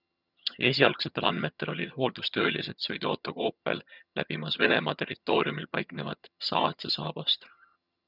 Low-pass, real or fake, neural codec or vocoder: 5.4 kHz; fake; vocoder, 22.05 kHz, 80 mel bands, HiFi-GAN